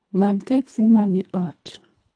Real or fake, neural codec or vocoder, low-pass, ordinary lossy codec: fake; codec, 24 kHz, 1.5 kbps, HILCodec; 9.9 kHz; AAC, 64 kbps